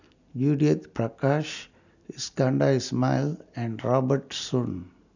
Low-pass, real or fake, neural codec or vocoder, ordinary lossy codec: 7.2 kHz; real; none; none